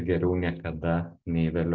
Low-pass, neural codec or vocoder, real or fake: 7.2 kHz; none; real